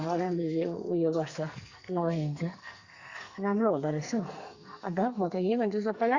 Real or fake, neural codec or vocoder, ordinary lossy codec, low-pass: fake; codec, 44.1 kHz, 2.6 kbps, SNAC; Opus, 64 kbps; 7.2 kHz